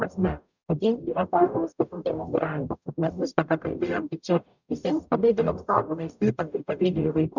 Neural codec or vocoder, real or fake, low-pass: codec, 44.1 kHz, 0.9 kbps, DAC; fake; 7.2 kHz